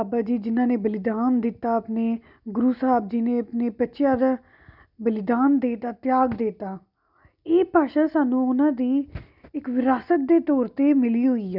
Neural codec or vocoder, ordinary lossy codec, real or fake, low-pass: none; none; real; 5.4 kHz